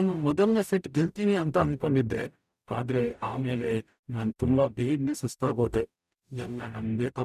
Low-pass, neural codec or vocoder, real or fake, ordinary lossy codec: 14.4 kHz; codec, 44.1 kHz, 0.9 kbps, DAC; fake; none